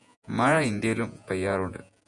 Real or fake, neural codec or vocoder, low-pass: fake; vocoder, 48 kHz, 128 mel bands, Vocos; 10.8 kHz